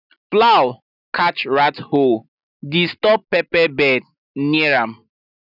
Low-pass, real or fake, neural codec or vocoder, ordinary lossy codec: 5.4 kHz; real; none; none